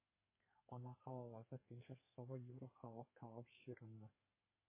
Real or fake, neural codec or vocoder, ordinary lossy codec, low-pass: fake; codec, 32 kHz, 1.9 kbps, SNAC; MP3, 16 kbps; 3.6 kHz